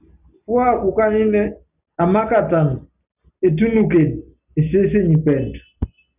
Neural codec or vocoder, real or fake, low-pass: none; real; 3.6 kHz